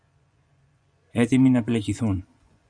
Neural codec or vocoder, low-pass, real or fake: vocoder, 24 kHz, 100 mel bands, Vocos; 9.9 kHz; fake